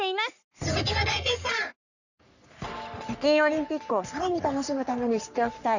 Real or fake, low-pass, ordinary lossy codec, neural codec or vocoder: fake; 7.2 kHz; none; codec, 44.1 kHz, 3.4 kbps, Pupu-Codec